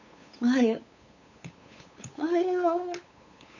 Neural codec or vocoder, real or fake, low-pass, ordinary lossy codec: codec, 16 kHz, 8 kbps, FunCodec, trained on LibriTTS, 25 frames a second; fake; 7.2 kHz; none